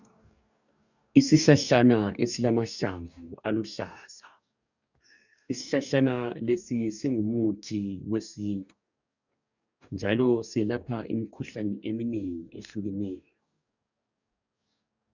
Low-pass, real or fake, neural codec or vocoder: 7.2 kHz; fake; codec, 44.1 kHz, 2.6 kbps, DAC